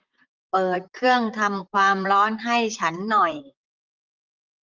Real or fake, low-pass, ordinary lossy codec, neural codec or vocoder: fake; 7.2 kHz; Opus, 24 kbps; vocoder, 44.1 kHz, 128 mel bands, Pupu-Vocoder